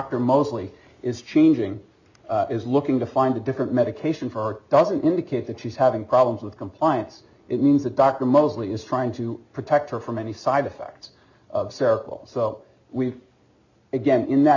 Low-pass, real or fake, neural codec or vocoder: 7.2 kHz; real; none